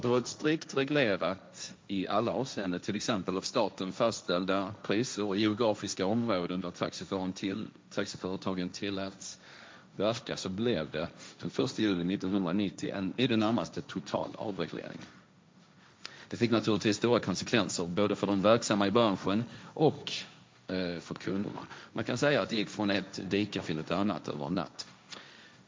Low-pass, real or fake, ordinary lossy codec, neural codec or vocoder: none; fake; none; codec, 16 kHz, 1.1 kbps, Voila-Tokenizer